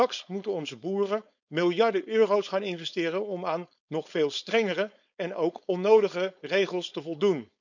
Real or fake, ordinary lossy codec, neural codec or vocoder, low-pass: fake; none; codec, 16 kHz, 4.8 kbps, FACodec; 7.2 kHz